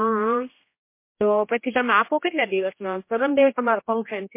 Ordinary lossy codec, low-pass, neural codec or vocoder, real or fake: MP3, 24 kbps; 3.6 kHz; codec, 16 kHz, 1 kbps, X-Codec, HuBERT features, trained on general audio; fake